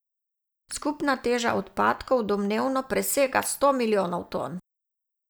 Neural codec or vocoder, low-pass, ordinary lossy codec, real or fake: none; none; none; real